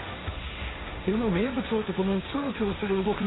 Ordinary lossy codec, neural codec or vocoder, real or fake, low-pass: AAC, 16 kbps; codec, 16 kHz, 1.1 kbps, Voila-Tokenizer; fake; 7.2 kHz